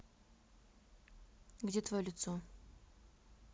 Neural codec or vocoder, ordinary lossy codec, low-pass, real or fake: none; none; none; real